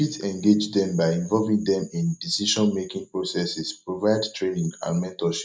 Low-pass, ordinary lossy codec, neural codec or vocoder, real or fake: none; none; none; real